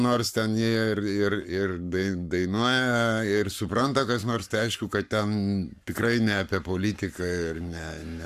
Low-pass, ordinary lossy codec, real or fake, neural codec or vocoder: 14.4 kHz; AAC, 96 kbps; fake; codec, 44.1 kHz, 7.8 kbps, Pupu-Codec